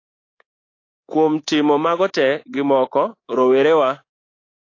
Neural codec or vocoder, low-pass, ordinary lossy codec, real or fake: codec, 24 kHz, 3.1 kbps, DualCodec; 7.2 kHz; AAC, 32 kbps; fake